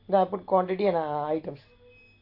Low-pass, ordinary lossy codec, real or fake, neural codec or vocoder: 5.4 kHz; AAC, 32 kbps; real; none